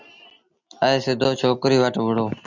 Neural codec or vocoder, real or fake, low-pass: none; real; 7.2 kHz